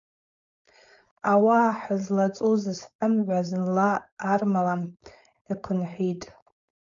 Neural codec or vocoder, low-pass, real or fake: codec, 16 kHz, 4.8 kbps, FACodec; 7.2 kHz; fake